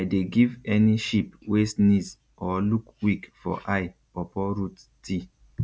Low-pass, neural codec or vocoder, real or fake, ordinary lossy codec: none; none; real; none